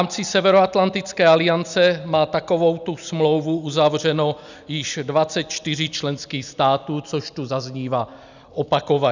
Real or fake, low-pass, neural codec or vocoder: real; 7.2 kHz; none